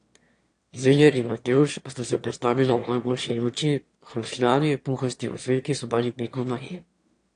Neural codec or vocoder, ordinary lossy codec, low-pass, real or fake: autoencoder, 22.05 kHz, a latent of 192 numbers a frame, VITS, trained on one speaker; AAC, 48 kbps; 9.9 kHz; fake